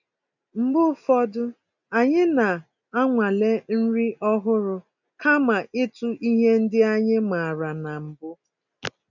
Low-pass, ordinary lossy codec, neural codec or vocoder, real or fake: 7.2 kHz; none; none; real